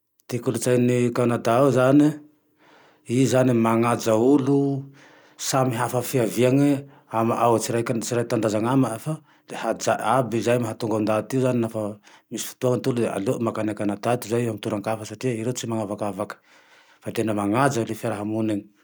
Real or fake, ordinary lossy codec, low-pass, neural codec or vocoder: real; none; none; none